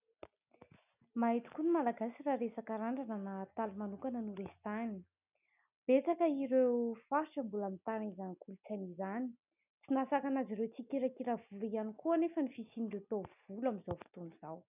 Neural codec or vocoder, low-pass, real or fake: none; 3.6 kHz; real